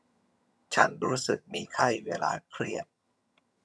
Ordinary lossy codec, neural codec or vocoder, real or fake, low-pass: none; vocoder, 22.05 kHz, 80 mel bands, HiFi-GAN; fake; none